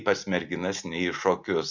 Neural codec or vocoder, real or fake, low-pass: none; real; 7.2 kHz